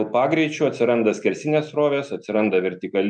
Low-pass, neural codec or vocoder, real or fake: 9.9 kHz; none; real